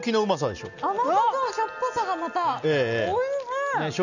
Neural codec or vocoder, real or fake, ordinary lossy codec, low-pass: none; real; none; 7.2 kHz